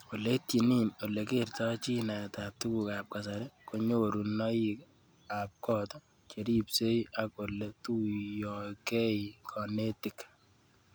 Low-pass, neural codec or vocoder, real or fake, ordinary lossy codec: none; none; real; none